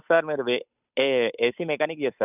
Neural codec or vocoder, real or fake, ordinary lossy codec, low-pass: codec, 16 kHz, 8 kbps, FunCodec, trained on Chinese and English, 25 frames a second; fake; none; 3.6 kHz